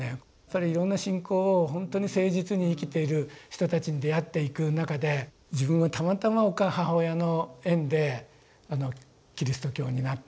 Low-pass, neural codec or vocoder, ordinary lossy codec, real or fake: none; none; none; real